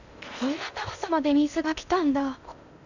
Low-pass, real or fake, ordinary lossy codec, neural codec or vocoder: 7.2 kHz; fake; none; codec, 16 kHz in and 24 kHz out, 0.6 kbps, FocalCodec, streaming, 2048 codes